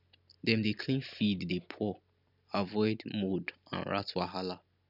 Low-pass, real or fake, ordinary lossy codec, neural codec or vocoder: 5.4 kHz; real; none; none